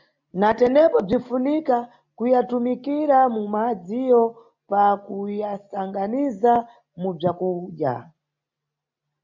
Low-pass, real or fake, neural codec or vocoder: 7.2 kHz; real; none